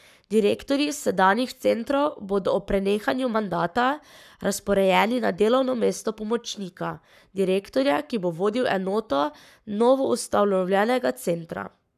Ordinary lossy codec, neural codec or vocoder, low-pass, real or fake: none; codec, 44.1 kHz, 7.8 kbps, Pupu-Codec; 14.4 kHz; fake